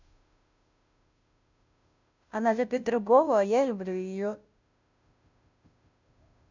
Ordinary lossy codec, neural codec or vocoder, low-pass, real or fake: none; codec, 16 kHz, 0.5 kbps, FunCodec, trained on Chinese and English, 25 frames a second; 7.2 kHz; fake